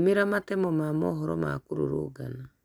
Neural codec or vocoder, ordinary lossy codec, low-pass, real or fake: none; none; 19.8 kHz; real